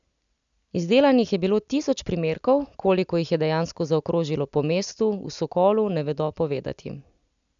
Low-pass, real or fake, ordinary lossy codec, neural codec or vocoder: 7.2 kHz; real; none; none